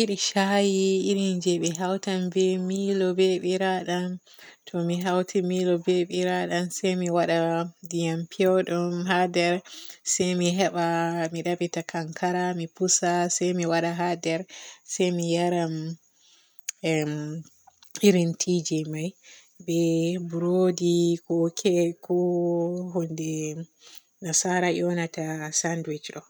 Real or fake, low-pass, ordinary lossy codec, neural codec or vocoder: real; none; none; none